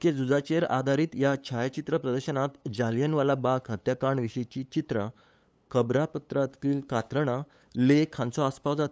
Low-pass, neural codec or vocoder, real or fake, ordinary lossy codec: none; codec, 16 kHz, 8 kbps, FunCodec, trained on LibriTTS, 25 frames a second; fake; none